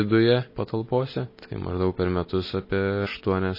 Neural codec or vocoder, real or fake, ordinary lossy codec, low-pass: none; real; MP3, 24 kbps; 5.4 kHz